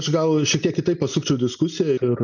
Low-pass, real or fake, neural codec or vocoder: 7.2 kHz; real; none